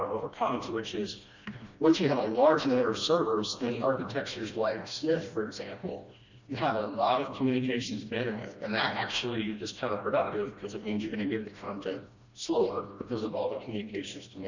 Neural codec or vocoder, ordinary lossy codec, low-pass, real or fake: codec, 16 kHz, 1 kbps, FreqCodec, smaller model; Opus, 64 kbps; 7.2 kHz; fake